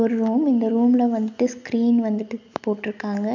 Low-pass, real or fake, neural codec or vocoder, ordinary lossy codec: 7.2 kHz; real; none; none